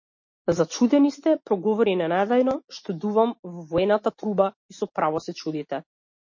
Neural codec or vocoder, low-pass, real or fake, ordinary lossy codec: none; 7.2 kHz; real; MP3, 32 kbps